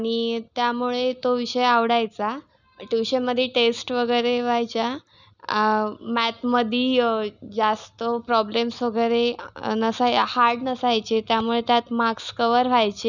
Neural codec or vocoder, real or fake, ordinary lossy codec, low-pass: none; real; none; 7.2 kHz